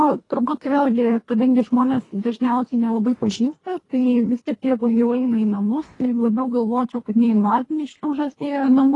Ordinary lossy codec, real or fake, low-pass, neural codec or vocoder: AAC, 32 kbps; fake; 10.8 kHz; codec, 24 kHz, 1.5 kbps, HILCodec